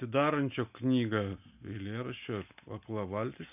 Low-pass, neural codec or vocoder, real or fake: 3.6 kHz; none; real